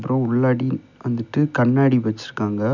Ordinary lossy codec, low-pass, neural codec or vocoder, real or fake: none; 7.2 kHz; none; real